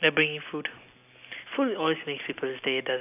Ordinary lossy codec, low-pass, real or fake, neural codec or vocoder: none; 3.6 kHz; real; none